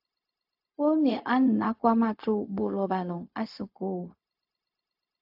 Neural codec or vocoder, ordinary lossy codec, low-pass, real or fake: codec, 16 kHz, 0.4 kbps, LongCat-Audio-Codec; AAC, 48 kbps; 5.4 kHz; fake